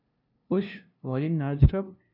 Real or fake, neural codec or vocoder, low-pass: fake; codec, 16 kHz, 0.5 kbps, FunCodec, trained on LibriTTS, 25 frames a second; 5.4 kHz